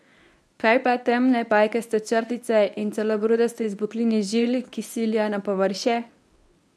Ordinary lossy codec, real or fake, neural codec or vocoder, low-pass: none; fake; codec, 24 kHz, 0.9 kbps, WavTokenizer, medium speech release version 1; none